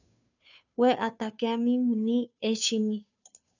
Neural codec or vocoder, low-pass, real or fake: codec, 16 kHz, 2 kbps, FunCodec, trained on Chinese and English, 25 frames a second; 7.2 kHz; fake